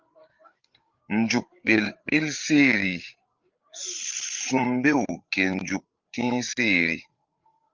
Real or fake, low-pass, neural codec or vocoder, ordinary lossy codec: fake; 7.2 kHz; codec, 16 kHz, 8 kbps, FreqCodec, larger model; Opus, 24 kbps